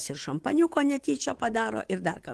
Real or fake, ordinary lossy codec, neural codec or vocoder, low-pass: fake; Opus, 16 kbps; codec, 24 kHz, 3.1 kbps, DualCodec; 10.8 kHz